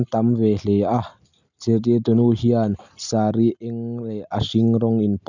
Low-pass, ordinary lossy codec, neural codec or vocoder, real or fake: 7.2 kHz; none; none; real